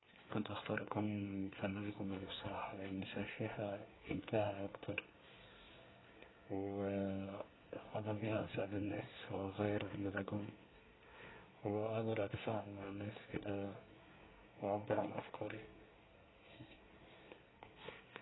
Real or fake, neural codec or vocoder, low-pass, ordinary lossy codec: fake; codec, 24 kHz, 1 kbps, SNAC; 7.2 kHz; AAC, 16 kbps